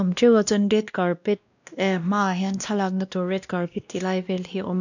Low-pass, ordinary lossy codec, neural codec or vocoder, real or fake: 7.2 kHz; none; codec, 16 kHz, 1 kbps, X-Codec, WavLM features, trained on Multilingual LibriSpeech; fake